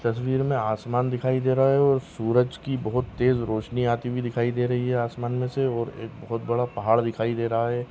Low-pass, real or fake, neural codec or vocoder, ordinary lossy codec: none; real; none; none